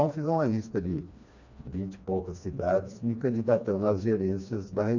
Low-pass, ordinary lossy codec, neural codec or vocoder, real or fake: 7.2 kHz; none; codec, 16 kHz, 2 kbps, FreqCodec, smaller model; fake